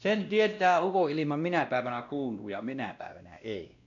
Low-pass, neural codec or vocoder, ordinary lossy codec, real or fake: 7.2 kHz; codec, 16 kHz, 1 kbps, X-Codec, WavLM features, trained on Multilingual LibriSpeech; none; fake